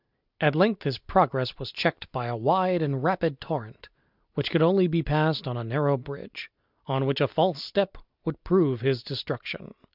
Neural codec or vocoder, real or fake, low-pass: none; real; 5.4 kHz